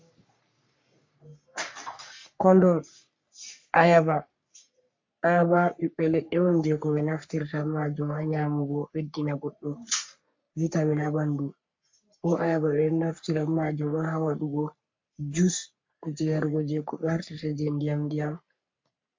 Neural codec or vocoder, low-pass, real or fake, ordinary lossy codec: codec, 44.1 kHz, 3.4 kbps, Pupu-Codec; 7.2 kHz; fake; MP3, 48 kbps